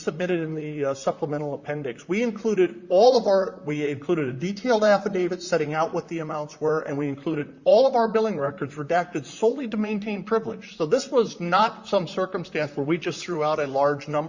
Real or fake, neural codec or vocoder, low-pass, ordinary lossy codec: fake; vocoder, 44.1 kHz, 128 mel bands, Pupu-Vocoder; 7.2 kHz; Opus, 64 kbps